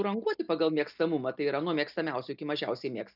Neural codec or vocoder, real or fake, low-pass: none; real; 5.4 kHz